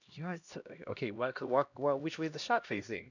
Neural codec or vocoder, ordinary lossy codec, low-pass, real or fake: codec, 16 kHz, 1 kbps, X-Codec, HuBERT features, trained on LibriSpeech; none; 7.2 kHz; fake